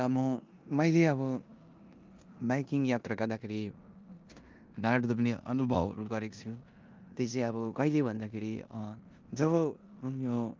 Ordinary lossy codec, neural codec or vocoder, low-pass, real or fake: Opus, 24 kbps; codec, 16 kHz in and 24 kHz out, 0.9 kbps, LongCat-Audio-Codec, four codebook decoder; 7.2 kHz; fake